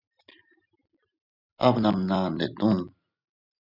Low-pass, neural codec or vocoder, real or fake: 5.4 kHz; none; real